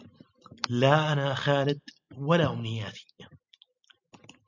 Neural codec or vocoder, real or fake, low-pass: none; real; 7.2 kHz